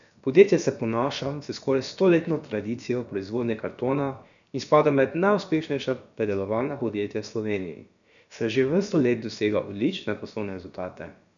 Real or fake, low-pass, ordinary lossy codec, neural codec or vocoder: fake; 7.2 kHz; none; codec, 16 kHz, about 1 kbps, DyCAST, with the encoder's durations